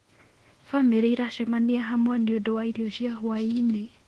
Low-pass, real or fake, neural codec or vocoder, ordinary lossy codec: 10.8 kHz; fake; codec, 24 kHz, 1.2 kbps, DualCodec; Opus, 16 kbps